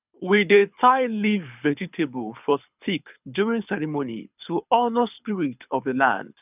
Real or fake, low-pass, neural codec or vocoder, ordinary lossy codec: fake; 3.6 kHz; codec, 24 kHz, 6 kbps, HILCodec; none